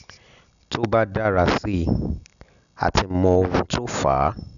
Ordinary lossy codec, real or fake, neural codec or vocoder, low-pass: none; real; none; 7.2 kHz